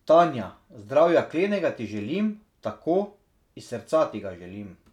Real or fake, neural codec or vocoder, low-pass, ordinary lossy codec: real; none; 19.8 kHz; none